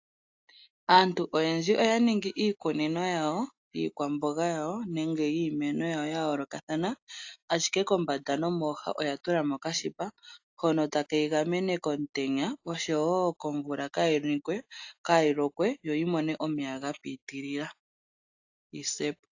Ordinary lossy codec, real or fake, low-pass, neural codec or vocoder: AAC, 48 kbps; real; 7.2 kHz; none